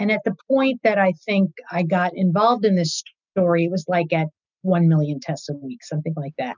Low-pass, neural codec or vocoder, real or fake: 7.2 kHz; none; real